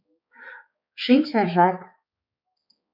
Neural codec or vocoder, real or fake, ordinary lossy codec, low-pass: codec, 16 kHz, 4 kbps, X-Codec, HuBERT features, trained on balanced general audio; fake; MP3, 48 kbps; 5.4 kHz